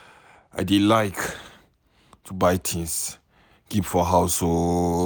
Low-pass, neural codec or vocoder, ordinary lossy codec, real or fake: none; none; none; real